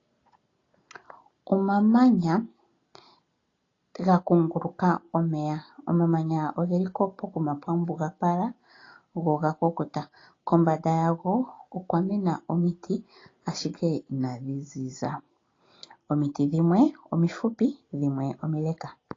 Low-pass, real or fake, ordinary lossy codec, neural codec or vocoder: 7.2 kHz; real; AAC, 32 kbps; none